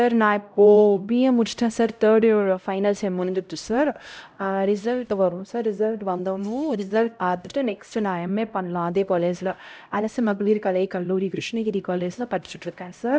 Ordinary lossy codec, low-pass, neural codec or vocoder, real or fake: none; none; codec, 16 kHz, 0.5 kbps, X-Codec, HuBERT features, trained on LibriSpeech; fake